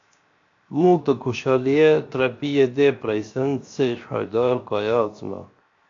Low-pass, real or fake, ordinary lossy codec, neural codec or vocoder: 7.2 kHz; fake; AAC, 48 kbps; codec, 16 kHz, 0.7 kbps, FocalCodec